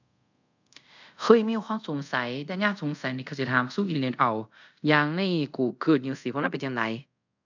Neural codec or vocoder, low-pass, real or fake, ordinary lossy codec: codec, 24 kHz, 0.5 kbps, DualCodec; 7.2 kHz; fake; none